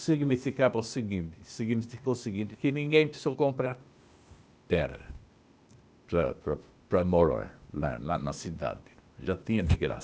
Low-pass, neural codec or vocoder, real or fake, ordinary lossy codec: none; codec, 16 kHz, 0.8 kbps, ZipCodec; fake; none